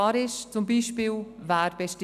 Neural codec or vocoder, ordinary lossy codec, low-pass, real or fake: none; none; 14.4 kHz; real